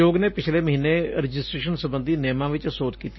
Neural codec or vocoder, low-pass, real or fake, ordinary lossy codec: none; 7.2 kHz; real; MP3, 24 kbps